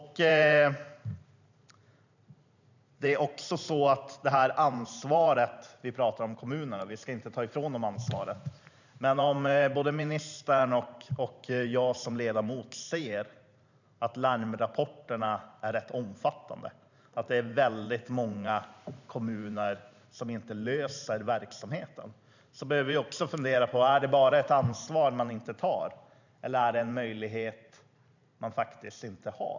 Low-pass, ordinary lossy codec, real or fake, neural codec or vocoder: 7.2 kHz; none; fake; vocoder, 44.1 kHz, 128 mel bands every 512 samples, BigVGAN v2